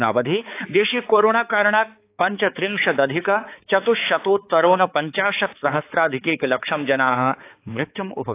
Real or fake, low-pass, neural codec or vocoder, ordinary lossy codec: fake; 3.6 kHz; codec, 16 kHz, 4 kbps, X-Codec, HuBERT features, trained on balanced general audio; AAC, 24 kbps